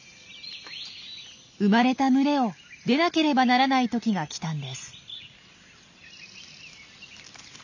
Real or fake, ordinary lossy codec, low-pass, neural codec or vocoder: real; none; 7.2 kHz; none